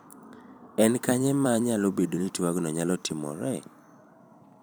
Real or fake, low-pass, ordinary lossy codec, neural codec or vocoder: fake; none; none; vocoder, 44.1 kHz, 128 mel bands every 256 samples, BigVGAN v2